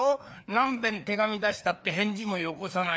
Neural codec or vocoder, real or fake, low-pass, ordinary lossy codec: codec, 16 kHz, 2 kbps, FreqCodec, larger model; fake; none; none